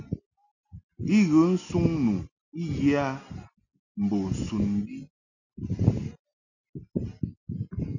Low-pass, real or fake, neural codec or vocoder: 7.2 kHz; real; none